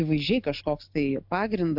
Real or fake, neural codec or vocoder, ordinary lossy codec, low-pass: real; none; AAC, 48 kbps; 5.4 kHz